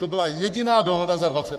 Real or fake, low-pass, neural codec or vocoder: fake; 14.4 kHz; codec, 44.1 kHz, 3.4 kbps, Pupu-Codec